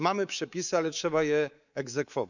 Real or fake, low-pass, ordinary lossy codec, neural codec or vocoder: fake; 7.2 kHz; none; codec, 24 kHz, 3.1 kbps, DualCodec